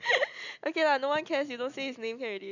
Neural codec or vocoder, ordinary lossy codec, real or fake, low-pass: none; none; real; 7.2 kHz